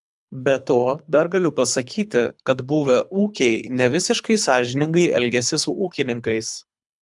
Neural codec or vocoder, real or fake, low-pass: codec, 24 kHz, 3 kbps, HILCodec; fake; 10.8 kHz